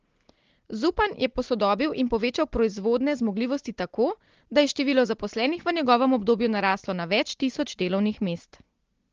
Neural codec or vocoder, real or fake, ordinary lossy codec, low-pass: none; real; Opus, 16 kbps; 7.2 kHz